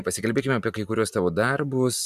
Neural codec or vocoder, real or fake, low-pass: none; real; 14.4 kHz